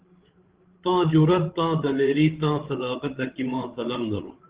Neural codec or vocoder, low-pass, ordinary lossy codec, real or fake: codec, 16 kHz, 16 kbps, FreqCodec, larger model; 3.6 kHz; Opus, 16 kbps; fake